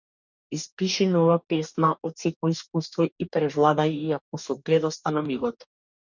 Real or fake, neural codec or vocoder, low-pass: fake; codec, 44.1 kHz, 2.6 kbps, DAC; 7.2 kHz